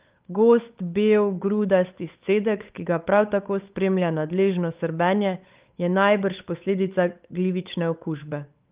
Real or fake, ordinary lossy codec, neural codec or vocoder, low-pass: fake; Opus, 32 kbps; codec, 16 kHz, 8 kbps, FunCodec, trained on Chinese and English, 25 frames a second; 3.6 kHz